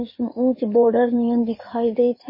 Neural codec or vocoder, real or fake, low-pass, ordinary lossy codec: codec, 16 kHz in and 24 kHz out, 2.2 kbps, FireRedTTS-2 codec; fake; 5.4 kHz; MP3, 24 kbps